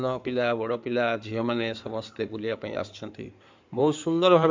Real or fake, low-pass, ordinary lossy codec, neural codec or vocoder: fake; 7.2 kHz; MP3, 64 kbps; codec, 16 kHz in and 24 kHz out, 2.2 kbps, FireRedTTS-2 codec